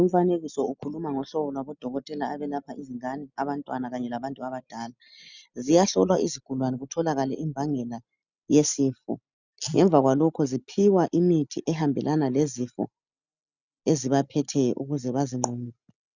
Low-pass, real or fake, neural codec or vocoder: 7.2 kHz; real; none